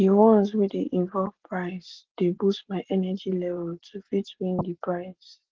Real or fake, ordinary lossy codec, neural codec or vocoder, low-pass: real; Opus, 16 kbps; none; 7.2 kHz